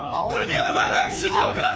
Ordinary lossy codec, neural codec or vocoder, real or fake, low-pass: none; codec, 16 kHz, 2 kbps, FreqCodec, larger model; fake; none